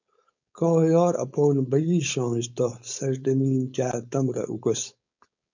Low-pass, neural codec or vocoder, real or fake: 7.2 kHz; codec, 16 kHz, 4.8 kbps, FACodec; fake